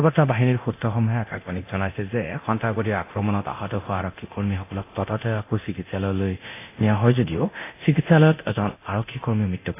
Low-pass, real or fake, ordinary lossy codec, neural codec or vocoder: 3.6 kHz; fake; AAC, 24 kbps; codec, 24 kHz, 0.9 kbps, DualCodec